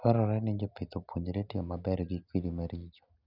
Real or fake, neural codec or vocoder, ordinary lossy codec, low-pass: real; none; none; 5.4 kHz